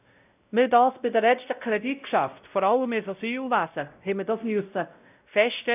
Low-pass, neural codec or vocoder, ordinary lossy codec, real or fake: 3.6 kHz; codec, 16 kHz, 0.5 kbps, X-Codec, WavLM features, trained on Multilingual LibriSpeech; none; fake